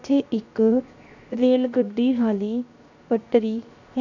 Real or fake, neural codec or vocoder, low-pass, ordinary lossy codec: fake; codec, 16 kHz, 0.7 kbps, FocalCodec; 7.2 kHz; none